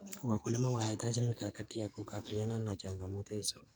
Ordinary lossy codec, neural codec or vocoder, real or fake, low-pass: none; codec, 44.1 kHz, 2.6 kbps, SNAC; fake; none